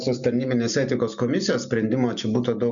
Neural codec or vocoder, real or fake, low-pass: none; real; 7.2 kHz